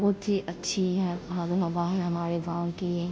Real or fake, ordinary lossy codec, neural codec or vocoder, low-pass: fake; none; codec, 16 kHz, 0.5 kbps, FunCodec, trained on Chinese and English, 25 frames a second; none